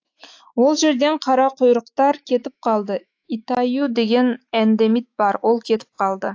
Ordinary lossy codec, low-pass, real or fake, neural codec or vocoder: AAC, 48 kbps; 7.2 kHz; fake; autoencoder, 48 kHz, 128 numbers a frame, DAC-VAE, trained on Japanese speech